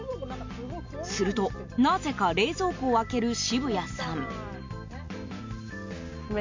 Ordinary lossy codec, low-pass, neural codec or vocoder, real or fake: MP3, 48 kbps; 7.2 kHz; none; real